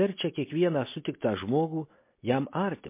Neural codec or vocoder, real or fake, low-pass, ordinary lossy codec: none; real; 3.6 kHz; MP3, 24 kbps